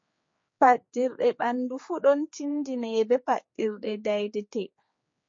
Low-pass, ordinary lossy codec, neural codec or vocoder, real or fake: 7.2 kHz; MP3, 32 kbps; codec, 16 kHz, 4 kbps, X-Codec, HuBERT features, trained on general audio; fake